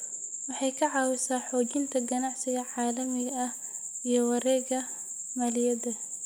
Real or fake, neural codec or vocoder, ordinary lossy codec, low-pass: real; none; none; none